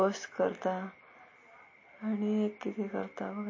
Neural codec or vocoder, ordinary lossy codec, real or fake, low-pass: none; MP3, 32 kbps; real; 7.2 kHz